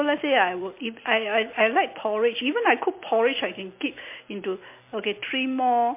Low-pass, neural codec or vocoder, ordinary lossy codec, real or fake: 3.6 kHz; none; MP3, 24 kbps; real